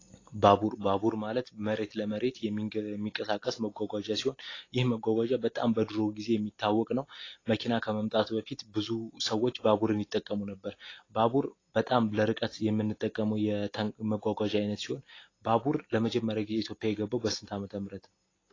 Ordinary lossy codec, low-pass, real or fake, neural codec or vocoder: AAC, 32 kbps; 7.2 kHz; real; none